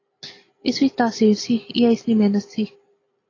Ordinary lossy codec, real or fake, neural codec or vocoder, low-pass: AAC, 32 kbps; real; none; 7.2 kHz